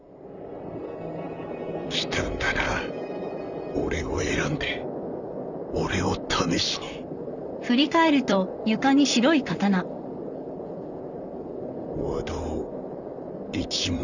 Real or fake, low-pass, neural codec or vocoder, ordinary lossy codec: fake; 7.2 kHz; vocoder, 44.1 kHz, 128 mel bands, Pupu-Vocoder; none